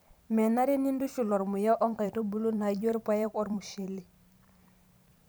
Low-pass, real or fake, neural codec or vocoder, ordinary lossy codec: none; fake; vocoder, 44.1 kHz, 128 mel bands every 512 samples, BigVGAN v2; none